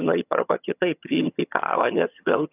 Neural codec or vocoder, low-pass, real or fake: vocoder, 22.05 kHz, 80 mel bands, HiFi-GAN; 3.6 kHz; fake